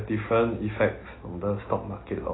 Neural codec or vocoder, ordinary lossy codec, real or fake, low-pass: none; AAC, 16 kbps; real; 7.2 kHz